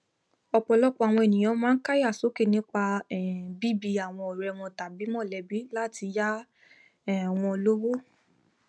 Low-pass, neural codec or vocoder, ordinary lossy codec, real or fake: none; none; none; real